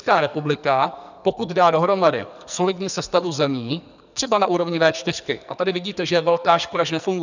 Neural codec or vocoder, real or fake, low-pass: codec, 44.1 kHz, 2.6 kbps, SNAC; fake; 7.2 kHz